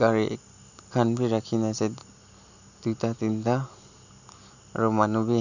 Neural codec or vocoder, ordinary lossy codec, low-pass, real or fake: none; none; 7.2 kHz; real